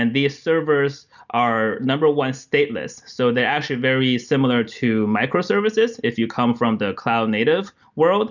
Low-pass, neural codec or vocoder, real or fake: 7.2 kHz; none; real